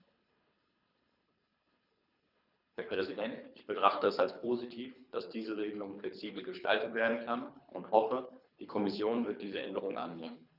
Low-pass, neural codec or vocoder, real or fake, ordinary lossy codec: 5.4 kHz; codec, 24 kHz, 3 kbps, HILCodec; fake; none